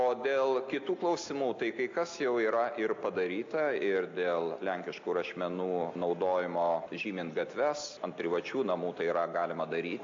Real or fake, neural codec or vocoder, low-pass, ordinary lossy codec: real; none; 7.2 kHz; AAC, 48 kbps